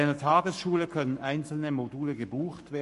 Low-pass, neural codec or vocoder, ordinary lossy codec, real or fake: 14.4 kHz; codec, 44.1 kHz, 7.8 kbps, Pupu-Codec; MP3, 48 kbps; fake